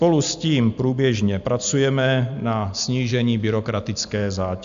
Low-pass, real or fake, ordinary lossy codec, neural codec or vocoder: 7.2 kHz; real; AAC, 96 kbps; none